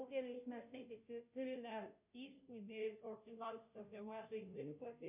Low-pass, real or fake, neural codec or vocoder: 3.6 kHz; fake; codec, 16 kHz, 0.5 kbps, FunCodec, trained on Chinese and English, 25 frames a second